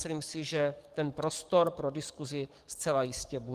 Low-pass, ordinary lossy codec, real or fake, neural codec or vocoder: 14.4 kHz; Opus, 24 kbps; fake; codec, 44.1 kHz, 7.8 kbps, DAC